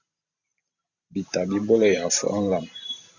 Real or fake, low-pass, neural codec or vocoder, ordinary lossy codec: fake; 7.2 kHz; vocoder, 44.1 kHz, 128 mel bands every 512 samples, BigVGAN v2; Opus, 64 kbps